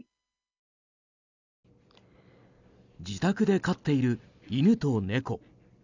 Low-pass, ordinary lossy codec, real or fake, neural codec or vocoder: 7.2 kHz; none; real; none